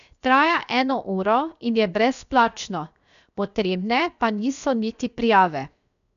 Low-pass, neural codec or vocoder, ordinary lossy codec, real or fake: 7.2 kHz; codec, 16 kHz, 0.7 kbps, FocalCodec; none; fake